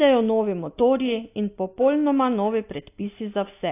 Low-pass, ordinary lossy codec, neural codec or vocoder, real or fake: 3.6 kHz; AAC, 24 kbps; none; real